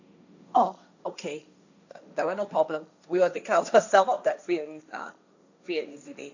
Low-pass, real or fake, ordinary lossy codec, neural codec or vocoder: 7.2 kHz; fake; none; codec, 16 kHz, 1.1 kbps, Voila-Tokenizer